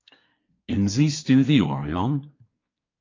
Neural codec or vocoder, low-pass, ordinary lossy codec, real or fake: codec, 24 kHz, 1 kbps, SNAC; 7.2 kHz; AAC, 32 kbps; fake